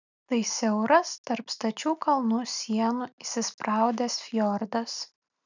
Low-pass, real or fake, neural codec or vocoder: 7.2 kHz; real; none